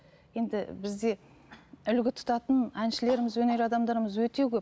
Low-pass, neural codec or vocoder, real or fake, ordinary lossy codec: none; none; real; none